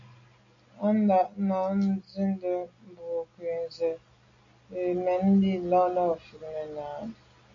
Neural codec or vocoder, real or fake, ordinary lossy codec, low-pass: none; real; AAC, 48 kbps; 7.2 kHz